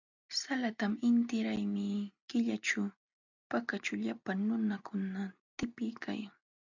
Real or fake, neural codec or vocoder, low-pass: real; none; 7.2 kHz